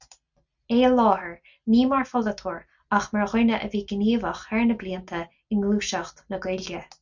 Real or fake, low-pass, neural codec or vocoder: real; 7.2 kHz; none